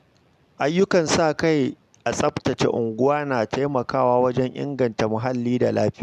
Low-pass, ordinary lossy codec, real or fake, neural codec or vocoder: 14.4 kHz; MP3, 96 kbps; real; none